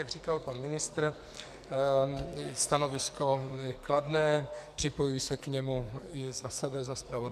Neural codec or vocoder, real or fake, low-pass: codec, 44.1 kHz, 2.6 kbps, SNAC; fake; 14.4 kHz